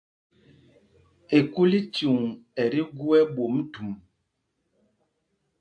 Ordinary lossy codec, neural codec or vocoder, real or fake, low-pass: AAC, 64 kbps; none; real; 9.9 kHz